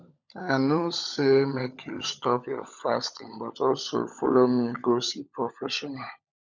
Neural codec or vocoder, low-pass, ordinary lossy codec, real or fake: codec, 16 kHz, 16 kbps, FunCodec, trained on LibriTTS, 50 frames a second; 7.2 kHz; none; fake